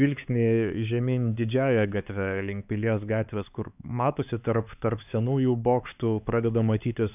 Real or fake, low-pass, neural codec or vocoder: fake; 3.6 kHz; codec, 16 kHz, 4 kbps, X-Codec, HuBERT features, trained on LibriSpeech